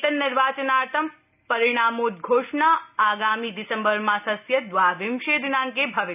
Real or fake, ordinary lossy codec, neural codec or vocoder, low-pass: real; none; none; 3.6 kHz